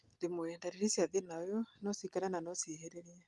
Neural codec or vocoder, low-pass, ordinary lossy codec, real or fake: none; 10.8 kHz; Opus, 32 kbps; real